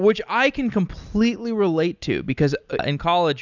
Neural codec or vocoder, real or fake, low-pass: none; real; 7.2 kHz